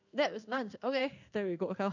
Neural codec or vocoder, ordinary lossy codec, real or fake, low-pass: codec, 16 kHz in and 24 kHz out, 1 kbps, XY-Tokenizer; none; fake; 7.2 kHz